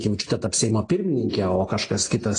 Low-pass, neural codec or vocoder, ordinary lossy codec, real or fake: 9.9 kHz; none; AAC, 32 kbps; real